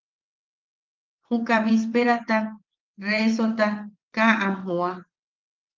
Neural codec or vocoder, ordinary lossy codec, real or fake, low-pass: vocoder, 22.05 kHz, 80 mel bands, WaveNeXt; Opus, 32 kbps; fake; 7.2 kHz